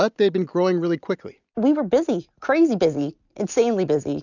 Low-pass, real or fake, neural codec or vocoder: 7.2 kHz; real; none